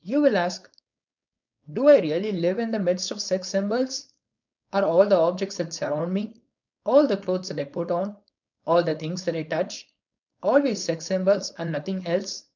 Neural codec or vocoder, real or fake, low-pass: codec, 16 kHz, 4.8 kbps, FACodec; fake; 7.2 kHz